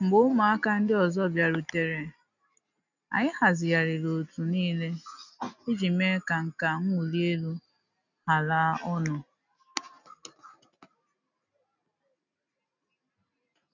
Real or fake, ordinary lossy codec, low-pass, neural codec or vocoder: real; none; 7.2 kHz; none